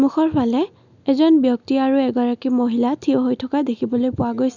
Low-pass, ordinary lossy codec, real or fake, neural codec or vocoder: 7.2 kHz; none; real; none